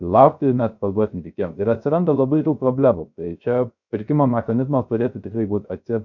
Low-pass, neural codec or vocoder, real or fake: 7.2 kHz; codec, 16 kHz, 0.3 kbps, FocalCodec; fake